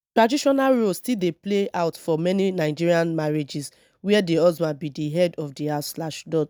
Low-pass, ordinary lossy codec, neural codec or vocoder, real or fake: none; none; none; real